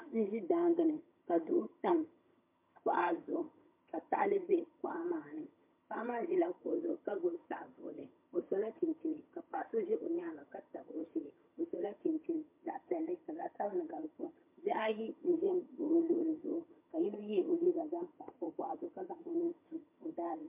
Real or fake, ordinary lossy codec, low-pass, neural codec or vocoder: fake; MP3, 32 kbps; 3.6 kHz; vocoder, 22.05 kHz, 80 mel bands, HiFi-GAN